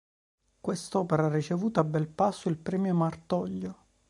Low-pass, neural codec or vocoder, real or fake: 10.8 kHz; none; real